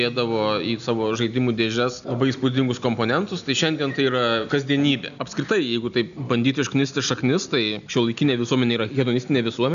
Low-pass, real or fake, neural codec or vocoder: 7.2 kHz; real; none